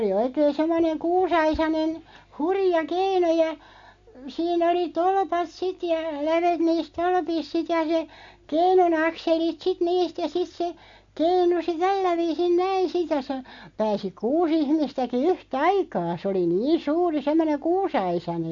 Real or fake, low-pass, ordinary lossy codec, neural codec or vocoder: real; 7.2 kHz; MP3, 48 kbps; none